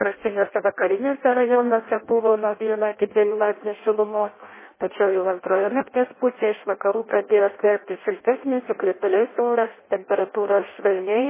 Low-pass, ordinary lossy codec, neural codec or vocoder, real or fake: 3.6 kHz; MP3, 16 kbps; codec, 16 kHz in and 24 kHz out, 0.6 kbps, FireRedTTS-2 codec; fake